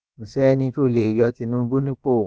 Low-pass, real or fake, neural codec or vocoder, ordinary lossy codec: none; fake; codec, 16 kHz, about 1 kbps, DyCAST, with the encoder's durations; none